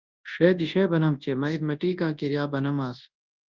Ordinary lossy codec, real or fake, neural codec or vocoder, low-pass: Opus, 32 kbps; fake; codec, 24 kHz, 0.9 kbps, WavTokenizer, large speech release; 7.2 kHz